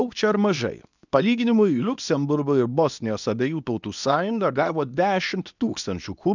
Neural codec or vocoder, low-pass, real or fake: codec, 24 kHz, 0.9 kbps, WavTokenizer, medium speech release version 2; 7.2 kHz; fake